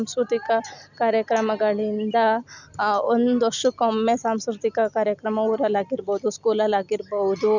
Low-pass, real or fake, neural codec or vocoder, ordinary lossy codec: 7.2 kHz; real; none; none